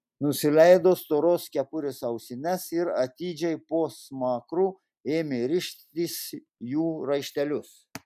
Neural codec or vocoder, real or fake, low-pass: none; real; 14.4 kHz